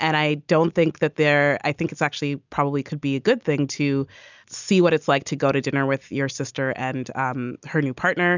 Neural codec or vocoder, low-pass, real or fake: none; 7.2 kHz; real